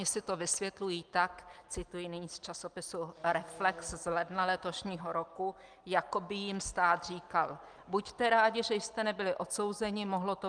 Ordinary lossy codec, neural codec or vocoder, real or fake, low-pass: Opus, 32 kbps; none; real; 9.9 kHz